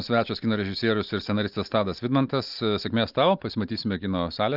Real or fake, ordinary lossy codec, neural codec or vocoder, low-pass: real; Opus, 24 kbps; none; 5.4 kHz